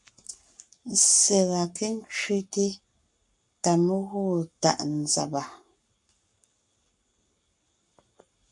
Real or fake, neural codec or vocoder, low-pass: fake; codec, 44.1 kHz, 7.8 kbps, Pupu-Codec; 10.8 kHz